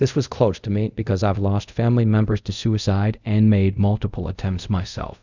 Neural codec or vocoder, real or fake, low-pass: codec, 24 kHz, 0.5 kbps, DualCodec; fake; 7.2 kHz